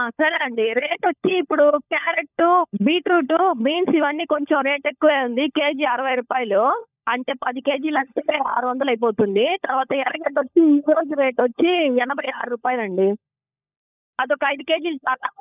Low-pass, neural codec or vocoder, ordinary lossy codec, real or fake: 3.6 kHz; codec, 16 kHz, 16 kbps, FunCodec, trained on LibriTTS, 50 frames a second; none; fake